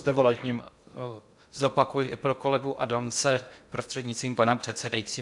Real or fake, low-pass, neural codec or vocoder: fake; 10.8 kHz; codec, 16 kHz in and 24 kHz out, 0.6 kbps, FocalCodec, streaming, 2048 codes